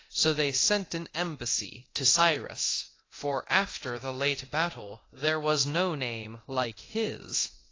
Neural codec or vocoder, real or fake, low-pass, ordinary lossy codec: codec, 24 kHz, 0.9 kbps, DualCodec; fake; 7.2 kHz; AAC, 32 kbps